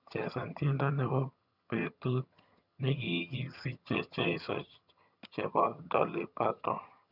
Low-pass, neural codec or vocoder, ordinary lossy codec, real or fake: 5.4 kHz; vocoder, 22.05 kHz, 80 mel bands, HiFi-GAN; none; fake